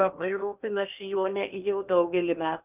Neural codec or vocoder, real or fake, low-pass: codec, 16 kHz in and 24 kHz out, 0.8 kbps, FocalCodec, streaming, 65536 codes; fake; 3.6 kHz